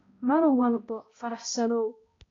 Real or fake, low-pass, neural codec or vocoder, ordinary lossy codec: fake; 7.2 kHz; codec, 16 kHz, 0.5 kbps, X-Codec, HuBERT features, trained on balanced general audio; AAC, 32 kbps